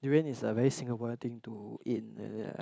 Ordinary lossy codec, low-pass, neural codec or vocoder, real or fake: none; none; none; real